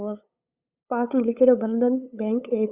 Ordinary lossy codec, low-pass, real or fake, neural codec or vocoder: Opus, 64 kbps; 3.6 kHz; fake; codec, 16 kHz, 8 kbps, FunCodec, trained on LibriTTS, 25 frames a second